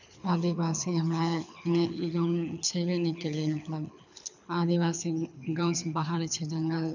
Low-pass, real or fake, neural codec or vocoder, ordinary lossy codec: 7.2 kHz; fake; codec, 24 kHz, 6 kbps, HILCodec; none